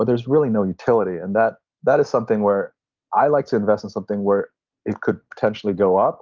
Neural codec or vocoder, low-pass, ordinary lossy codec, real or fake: none; 7.2 kHz; Opus, 24 kbps; real